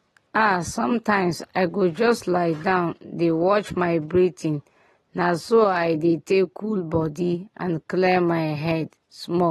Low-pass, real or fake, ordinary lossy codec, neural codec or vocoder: 14.4 kHz; real; AAC, 32 kbps; none